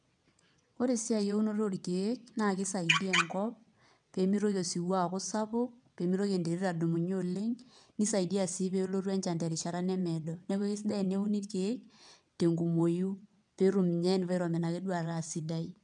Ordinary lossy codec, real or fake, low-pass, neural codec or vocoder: none; fake; 9.9 kHz; vocoder, 22.05 kHz, 80 mel bands, WaveNeXt